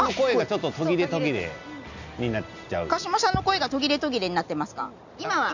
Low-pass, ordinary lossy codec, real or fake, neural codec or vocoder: 7.2 kHz; none; real; none